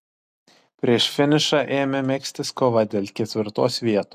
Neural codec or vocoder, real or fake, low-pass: none; real; 14.4 kHz